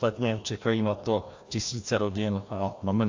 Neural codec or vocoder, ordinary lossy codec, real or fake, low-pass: codec, 16 kHz, 1 kbps, FreqCodec, larger model; AAC, 48 kbps; fake; 7.2 kHz